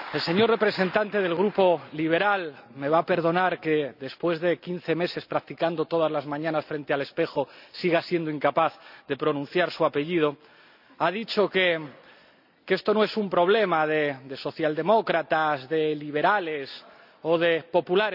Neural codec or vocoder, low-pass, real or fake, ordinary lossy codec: none; 5.4 kHz; real; none